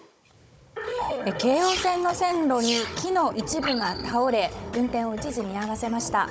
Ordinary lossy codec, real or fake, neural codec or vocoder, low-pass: none; fake; codec, 16 kHz, 16 kbps, FunCodec, trained on Chinese and English, 50 frames a second; none